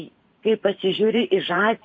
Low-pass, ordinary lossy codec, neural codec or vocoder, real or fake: 7.2 kHz; MP3, 32 kbps; vocoder, 44.1 kHz, 128 mel bands every 512 samples, BigVGAN v2; fake